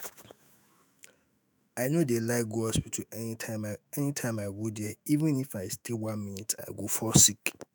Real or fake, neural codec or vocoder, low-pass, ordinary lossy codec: fake; autoencoder, 48 kHz, 128 numbers a frame, DAC-VAE, trained on Japanese speech; none; none